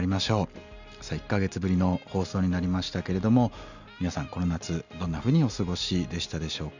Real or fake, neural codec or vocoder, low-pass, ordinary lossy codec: real; none; 7.2 kHz; none